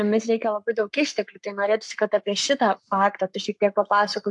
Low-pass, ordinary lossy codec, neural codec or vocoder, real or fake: 10.8 kHz; AAC, 64 kbps; codec, 44.1 kHz, 7.8 kbps, Pupu-Codec; fake